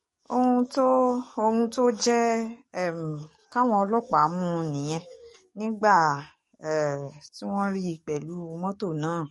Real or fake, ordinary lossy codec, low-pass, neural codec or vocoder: fake; MP3, 48 kbps; 19.8 kHz; codec, 44.1 kHz, 7.8 kbps, DAC